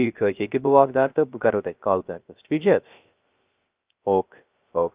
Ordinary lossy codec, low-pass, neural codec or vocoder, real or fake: Opus, 24 kbps; 3.6 kHz; codec, 16 kHz, 0.3 kbps, FocalCodec; fake